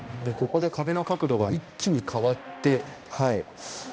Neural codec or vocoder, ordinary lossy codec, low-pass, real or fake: codec, 16 kHz, 1 kbps, X-Codec, HuBERT features, trained on balanced general audio; none; none; fake